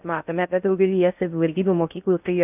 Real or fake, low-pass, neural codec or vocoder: fake; 3.6 kHz; codec, 16 kHz in and 24 kHz out, 0.6 kbps, FocalCodec, streaming, 2048 codes